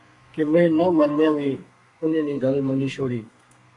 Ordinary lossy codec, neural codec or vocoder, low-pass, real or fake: AAC, 48 kbps; codec, 32 kHz, 1.9 kbps, SNAC; 10.8 kHz; fake